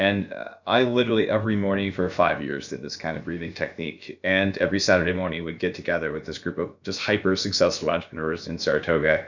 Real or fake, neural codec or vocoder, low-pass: fake; codec, 16 kHz, about 1 kbps, DyCAST, with the encoder's durations; 7.2 kHz